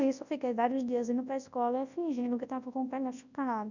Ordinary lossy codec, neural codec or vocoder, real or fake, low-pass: none; codec, 24 kHz, 0.9 kbps, WavTokenizer, large speech release; fake; 7.2 kHz